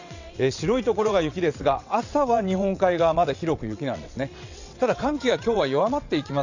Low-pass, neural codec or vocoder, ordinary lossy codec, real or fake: 7.2 kHz; vocoder, 22.05 kHz, 80 mel bands, WaveNeXt; none; fake